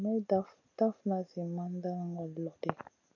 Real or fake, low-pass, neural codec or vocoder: real; 7.2 kHz; none